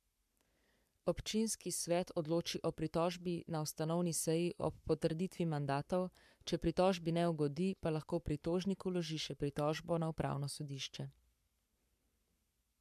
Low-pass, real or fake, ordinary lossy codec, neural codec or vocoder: 14.4 kHz; fake; MP3, 96 kbps; codec, 44.1 kHz, 7.8 kbps, Pupu-Codec